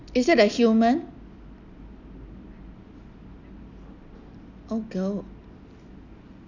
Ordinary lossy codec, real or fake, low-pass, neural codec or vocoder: none; real; 7.2 kHz; none